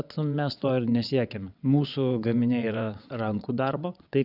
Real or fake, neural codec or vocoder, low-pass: fake; vocoder, 22.05 kHz, 80 mel bands, WaveNeXt; 5.4 kHz